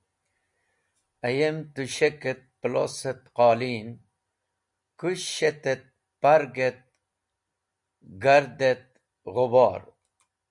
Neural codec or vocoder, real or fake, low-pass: none; real; 10.8 kHz